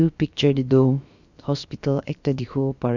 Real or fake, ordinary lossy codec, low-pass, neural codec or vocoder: fake; Opus, 64 kbps; 7.2 kHz; codec, 16 kHz, about 1 kbps, DyCAST, with the encoder's durations